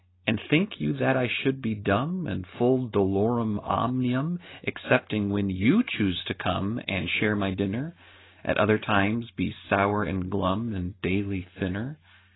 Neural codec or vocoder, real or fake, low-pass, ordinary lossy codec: none; real; 7.2 kHz; AAC, 16 kbps